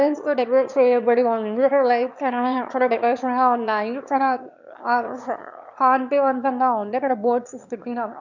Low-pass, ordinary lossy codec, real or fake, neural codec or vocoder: 7.2 kHz; none; fake; autoencoder, 22.05 kHz, a latent of 192 numbers a frame, VITS, trained on one speaker